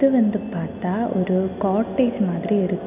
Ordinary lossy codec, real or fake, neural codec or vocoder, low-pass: none; real; none; 3.6 kHz